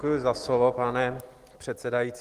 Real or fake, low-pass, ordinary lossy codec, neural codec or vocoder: real; 14.4 kHz; Opus, 24 kbps; none